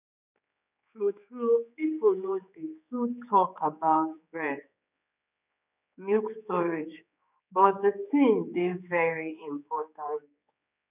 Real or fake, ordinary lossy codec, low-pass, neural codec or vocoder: fake; none; 3.6 kHz; codec, 16 kHz, 4 kbps, X-Codec, HuBERT features, trained on general audio